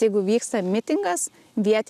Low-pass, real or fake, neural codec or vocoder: 14.4 kHz; real; none